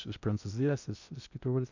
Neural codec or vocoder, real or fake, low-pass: codec, 16 kHz in and 24 kHz out, 0.6 kbps, FocalCodec, streaming, 2048 codes; fake; 7.2 kHz